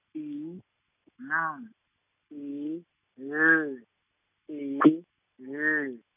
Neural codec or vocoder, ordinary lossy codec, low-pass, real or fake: none; none; 3.6 kHz; real